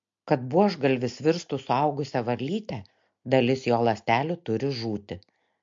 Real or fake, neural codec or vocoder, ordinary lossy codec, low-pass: real; none; MP3, 48 kbps; 7.2 kHz